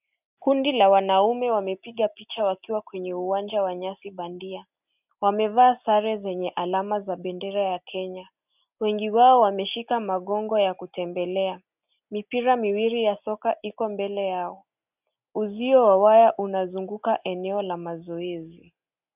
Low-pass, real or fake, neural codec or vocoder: 3.6 kHz; real; none